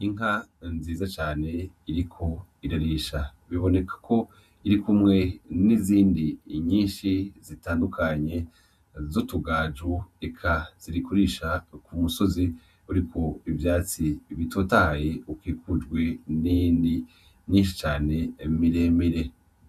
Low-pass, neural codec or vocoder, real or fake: 14.4 kHz; vocoder, 48 kHz, 128 mel bands, Vocos; fake